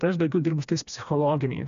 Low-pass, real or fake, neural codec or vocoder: 7.2 kHz; fake; codec, 16 kHz, 2 kbps, FreqCodec, smaller model